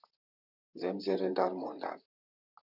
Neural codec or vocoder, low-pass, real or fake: vocoder, 44.1 kHz, 128 mel bands, Pupu-Vocoder; 5.4 kHz; fake